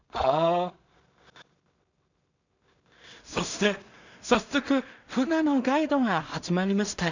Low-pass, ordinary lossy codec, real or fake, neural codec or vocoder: 7.2 kHz; none; fake; codec, 16 kHz in and 24 kHz out, 0.4 kbps, LongCat-Audio-Codec, two codebook decoder